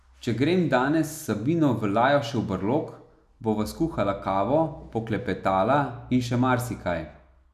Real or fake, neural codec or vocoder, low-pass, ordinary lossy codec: real; none; 14.4 kHz; none